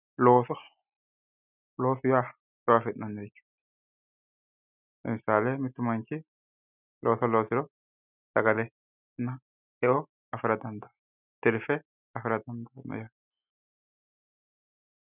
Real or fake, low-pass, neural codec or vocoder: real; 3.6 kHz; none